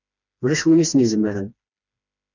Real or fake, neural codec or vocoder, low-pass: fake; codec, 16 kHz, 2 kbps, FreqCodec, smaller model; 7.2 kHz